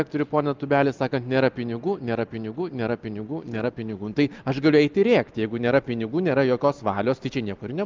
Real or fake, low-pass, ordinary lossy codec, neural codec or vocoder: real; 7.2 kHz; Opus, 32 kbps; none